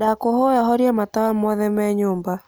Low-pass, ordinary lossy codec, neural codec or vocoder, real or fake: none; none; none; real